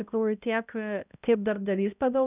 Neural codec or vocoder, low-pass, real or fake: codec, 16 kHz, 0.5 kbps, X-Codec, HuBERT features, trained on balanced general audio; 3.6 kHz; fake